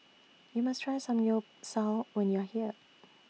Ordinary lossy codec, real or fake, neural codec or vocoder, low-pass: none; real; none; none